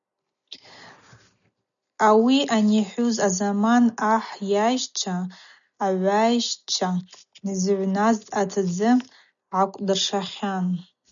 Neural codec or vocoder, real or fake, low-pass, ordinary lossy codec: none; real; 7.2 kHz; MP3, 96 kbps